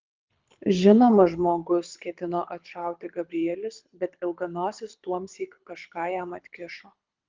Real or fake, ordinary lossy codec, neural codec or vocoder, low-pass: fake; Opus, 24 kbps; codec, 24 kHz, 6 kbps, HILCodec; 7.2 kHz